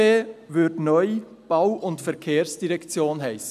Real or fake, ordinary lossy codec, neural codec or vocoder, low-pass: real; none; none; 14.4 kHz